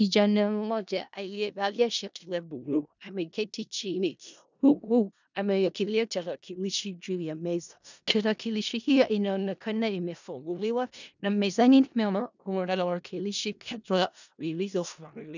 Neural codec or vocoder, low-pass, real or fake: codec, 16 kHz in and 24 kHz out, 0.4 kbps, LongCat-Audio-Codec, four codebook decoder; 7.2 kHz; fake